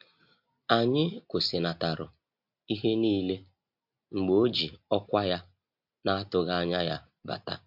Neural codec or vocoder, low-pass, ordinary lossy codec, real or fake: none; 5.4 kHz; MP3, 48 kbps; real